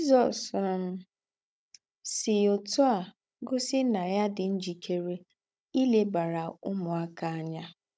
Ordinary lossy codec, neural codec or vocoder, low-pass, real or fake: none; codec, 16 kHz, 16 kbps, FunCodec, trained on Chinese and English, 50 frames a second; none; fake